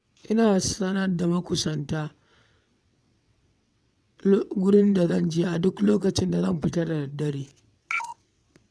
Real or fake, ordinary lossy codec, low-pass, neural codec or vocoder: fake; none; none; vocoder, 22.05 kHz, 80 mel bands, WaveNeXt